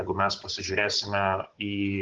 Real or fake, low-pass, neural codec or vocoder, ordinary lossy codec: fake; 7.2 kHz; codec, 16 kHz, 16 kbps, FunCodec, trained on Chinese and English, 50 frames a second; Opus, 16 kbps